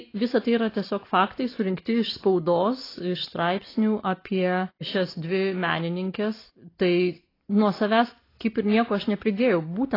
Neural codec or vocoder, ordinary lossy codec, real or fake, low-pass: none; AAC, 24 kbps; real; 5.4 kHz